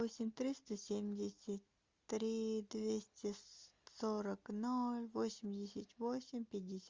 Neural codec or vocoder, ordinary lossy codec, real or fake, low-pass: none; Opus, 32 kbps; real; 7.2 kHz